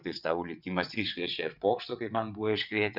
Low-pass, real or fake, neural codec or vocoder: 5.4 kHz; fake; vocoder, 22.05 kHz, 80 mel bands, WaveNeXt